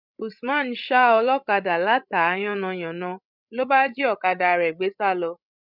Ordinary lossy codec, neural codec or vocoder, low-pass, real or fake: none; codec, 16 kHz, 16 kbps, FreqCodec, larger model; 5.4 kHz; fake